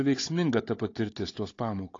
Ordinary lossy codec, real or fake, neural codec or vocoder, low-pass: AAC, 32 kbps; fake; codec, 16 kHz, 16 kbps, FunCodec, trained on Chinese and English, 50 frames a second; 7.2 kHz